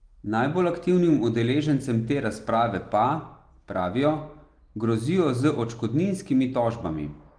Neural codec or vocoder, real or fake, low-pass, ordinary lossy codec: none; real; 9.9 kHz; Opus, 24 kbps